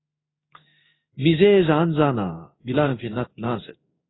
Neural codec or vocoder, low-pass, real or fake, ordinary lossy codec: codec, 16 kHz in and 24 kHz out, 1 kbps, XY-Tokenizer; 7.2 kHz; fake; AAC, 16 kbps